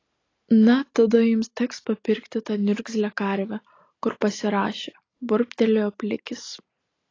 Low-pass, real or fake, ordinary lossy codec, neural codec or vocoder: 7.2 kHz; real; AAC, 32 kbps; none